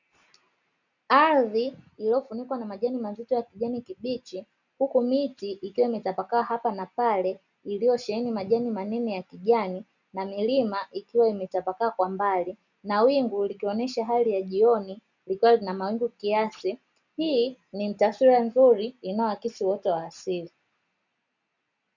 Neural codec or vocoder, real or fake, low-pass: none; real; 7.2 kHz